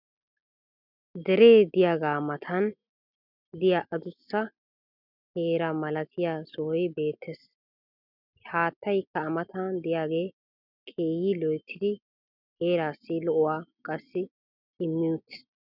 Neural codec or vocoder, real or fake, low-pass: none; real; 5.4 kHz